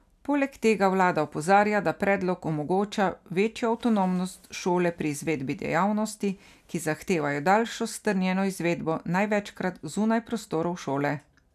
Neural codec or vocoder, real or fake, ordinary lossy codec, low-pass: none; real; none; 14.4 kHz